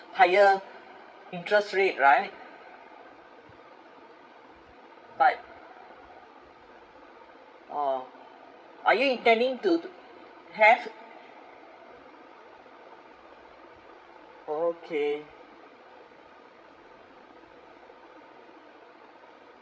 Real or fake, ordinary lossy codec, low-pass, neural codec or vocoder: fake; none; none; codec, 16 kHz, 16 kbps, FreqCodec, larger model